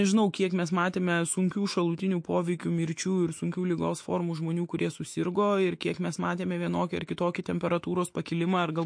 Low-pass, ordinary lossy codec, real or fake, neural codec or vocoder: 9.9 kHz; MP3, 48 kbps; fake; autoencoder, 48 kHz, 128 numbers a frame, DAC-VAE, trained on Japanese speech